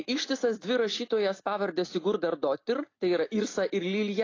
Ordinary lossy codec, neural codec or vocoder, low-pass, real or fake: AAC, 32 kbps; none; 7.2 kHz; real